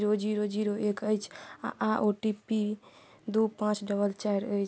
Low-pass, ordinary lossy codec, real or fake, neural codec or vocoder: none; none; real; none